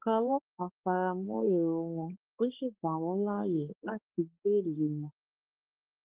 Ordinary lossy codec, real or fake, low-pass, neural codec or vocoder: Opus, 16 kbps; fake; 3.6 kHz; codec, 16 kHz, 2 kbps, X-Codec, HuBERT features, trained on balanced general audio